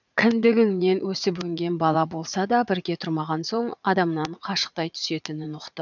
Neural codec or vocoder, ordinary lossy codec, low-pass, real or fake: vocoder, 44.1 kHz, 80 mel bands, Vocos; none; 7.2 kHz; fake